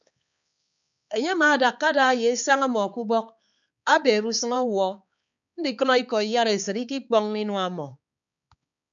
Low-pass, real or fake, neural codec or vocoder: 7.2 kHz; fake; codec, 16 kHz, 4 kbps, X-Codec, HuBERT features, trained on balanced general audio